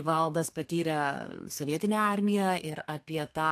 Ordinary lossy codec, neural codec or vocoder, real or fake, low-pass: AAC, 64 kbps; codec, 32 kHz, 1.9 kbps, SNAC; fake; 14.4 kHz